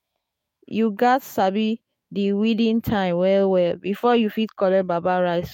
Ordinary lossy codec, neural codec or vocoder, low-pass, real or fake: MP3, 64 kbps; autoencoder, 48 kHz, 128 numbers a frame, DAC-VAE, trained on Japanese speech; 19.8 kHz; fake